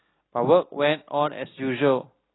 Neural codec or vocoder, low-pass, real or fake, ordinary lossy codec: none; 7.2 kHz; real; AAC, 16 kbps